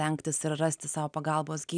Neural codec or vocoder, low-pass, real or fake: none; 9.9 kHz; real